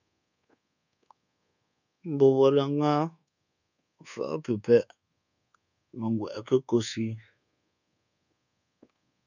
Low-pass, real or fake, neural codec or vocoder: 7.2 kHz; fake; codec, 24 kHz, 1.2 kbps, DualCodec